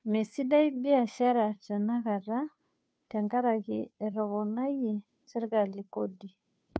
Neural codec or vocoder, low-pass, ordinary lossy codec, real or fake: codec, 16 kHz, 2 kbps, FunCodec, trained on Chinese and English, 25 frames a second; none; none; fake